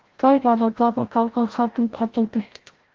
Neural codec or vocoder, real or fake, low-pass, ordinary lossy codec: codec, 16 kHz, 0.5 kbps, FreqCodec, larger model; fake; 7.2 kHz; Opus, 16 kbps